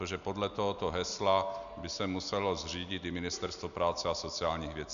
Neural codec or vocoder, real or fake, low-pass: none; real; 7.2 kHz